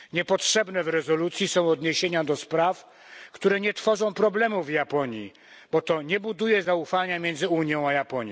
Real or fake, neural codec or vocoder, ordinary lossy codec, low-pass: real; none; none; none